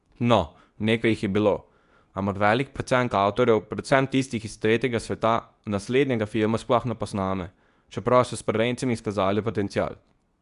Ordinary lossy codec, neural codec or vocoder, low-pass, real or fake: none; codec, 24 kHz, 0.9 kbps, WavTokenizer, medium speech release version 2; 10.8 kHz; fake